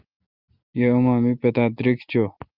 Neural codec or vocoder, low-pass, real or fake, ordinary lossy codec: none; 5.4 kHz; real; Opus, 32 kbps